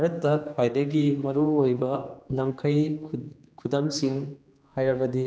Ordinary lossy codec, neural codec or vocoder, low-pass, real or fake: none; codec, 16 kHz, 2 kbps, X-Codec, HuBERT features, trained on general audio; none; fake